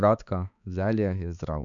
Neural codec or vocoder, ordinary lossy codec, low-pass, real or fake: codec, 16 kHz, 4 kbps, X-Codec, HuBERT features, trained on balanced general audio; none; 7.2 kHz; fake